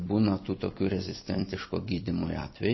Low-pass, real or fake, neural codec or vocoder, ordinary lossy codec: 7.2 kHz; fake; vocoder, 44.1 kHz, 128 mel bands every 256 samples, BigVGAN v2; MP3, 24 kbps